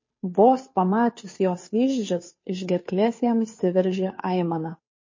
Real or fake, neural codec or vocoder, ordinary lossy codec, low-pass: fake; codec, 16 kHz, 2 kbps, FunCodec, trained on Chinese and English, 25 frames a second; MP3, 32 kbps; 7.2 kHz